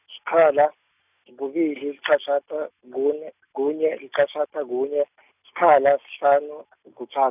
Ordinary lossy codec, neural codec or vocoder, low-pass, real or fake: none; none; 3.6 kHz; real